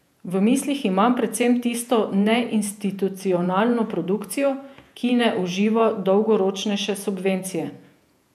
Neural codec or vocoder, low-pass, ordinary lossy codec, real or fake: vocoder, 48 kHz, 128 mel bands, Vocos; 14.4 kHz; none; fake